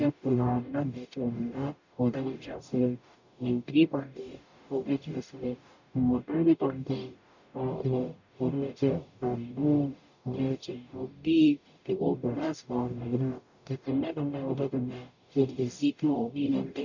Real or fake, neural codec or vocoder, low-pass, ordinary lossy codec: fake; codec, 44.1 kHz, 0.9 kbps, DAC; 7.2 kHz; none